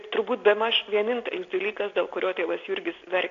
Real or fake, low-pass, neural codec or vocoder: real; 7.2 kHz; none